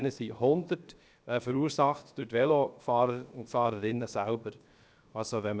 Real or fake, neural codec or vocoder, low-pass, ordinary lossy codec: fake; codec, 16 kHz, 0.7 kbps, FocalCodec; none; none